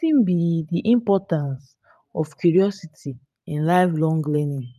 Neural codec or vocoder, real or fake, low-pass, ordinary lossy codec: vocoder, 44.1 kHz, 128 mel bands every 512 samples, BigVGAN v2; fake; 14.4 kHz; none